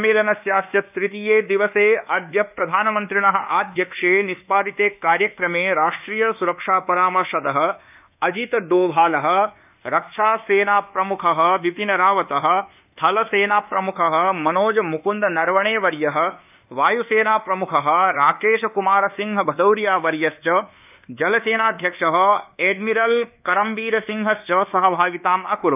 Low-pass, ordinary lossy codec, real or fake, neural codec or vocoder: 3.6 kHz; none; fake; codec, 24 kHz, 1.2 kbps, DualCodec